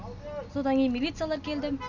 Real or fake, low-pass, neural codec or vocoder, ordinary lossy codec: real; 7.2 kHz; none; none